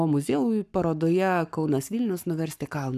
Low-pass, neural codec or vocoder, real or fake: 14.4 kHz; codec, 44.1 kHz, 7.8 kbps, Pupu-Codec; fake